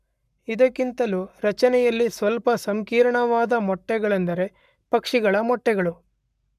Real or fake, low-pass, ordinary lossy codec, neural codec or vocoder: fake; 14.4 kHz; none; codec, 44.1 kHz, 7.8 kbps, Pupu-Codec